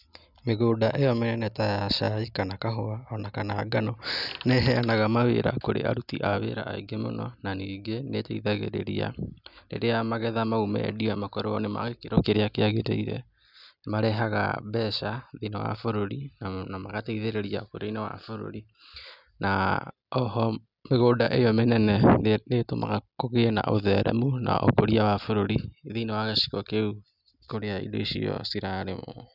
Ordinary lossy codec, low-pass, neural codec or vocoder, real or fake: none; 5.4 kHz; none; real